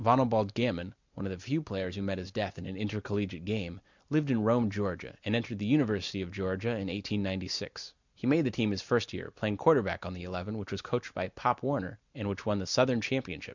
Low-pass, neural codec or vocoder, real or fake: 7.2 kHz; none; real